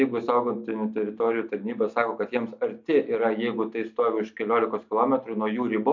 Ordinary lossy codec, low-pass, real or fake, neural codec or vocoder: MP3, 48 kbps; 7.2 kHz; real; none